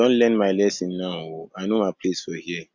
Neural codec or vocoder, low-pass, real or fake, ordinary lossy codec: none; 7.2 kHz; real; Opus, 64 kbps